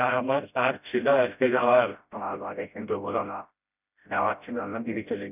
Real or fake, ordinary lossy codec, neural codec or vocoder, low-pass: fake; none; codec, 16 kHz, 0.5 kbps, FreqCodec, smaller model; 3.6 kHz